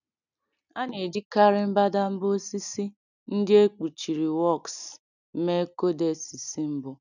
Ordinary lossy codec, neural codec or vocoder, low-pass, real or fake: none; none; 7.2 kHz; real